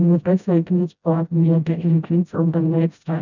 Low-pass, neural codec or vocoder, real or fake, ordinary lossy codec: 7.2 kHz; codec, 16 kHz, 0.5 kbps, FreqCodec, smaller model; fake; none